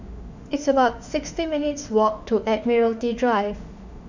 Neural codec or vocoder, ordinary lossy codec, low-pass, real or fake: autoencoder, 48 kHz, 32 numbers a frame, DAC-VAE, trained on Japanese speech; none; 7.2 kHz; fake